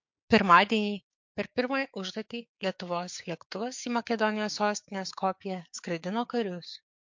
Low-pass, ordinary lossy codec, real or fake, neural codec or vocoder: 7.2 kHz; MP3, 48 kbps; fake; codec, 16 kHz, 6 kbps, DAC